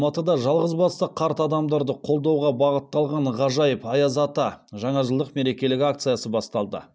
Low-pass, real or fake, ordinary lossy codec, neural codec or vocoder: none; real; none; none